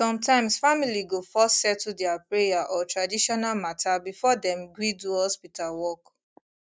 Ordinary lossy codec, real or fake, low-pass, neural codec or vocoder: none; real; none; none